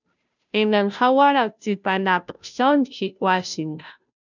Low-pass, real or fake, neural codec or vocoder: 7.2 kHz; fake; codec, 16 kHz, 0.5 kbps, FunCodec, trained on Chinese and English, 25 frames a second